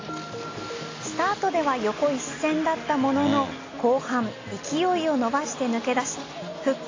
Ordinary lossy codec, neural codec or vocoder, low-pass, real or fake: AAC, 32 kbps; none; 7.2 kHz; real